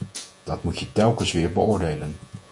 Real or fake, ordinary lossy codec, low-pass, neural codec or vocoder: fake; MP3, 64 kbps; 10.8 kHz; vocoder, 48 kHz, 128 mel bands, Vocos